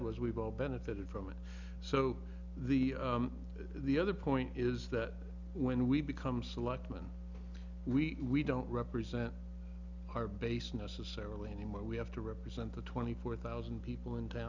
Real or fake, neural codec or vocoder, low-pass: real; none; 7.2 kHz